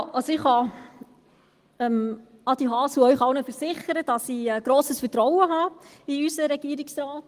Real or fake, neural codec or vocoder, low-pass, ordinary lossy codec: real; none; 14.4 kHz; Opus, 24 kbps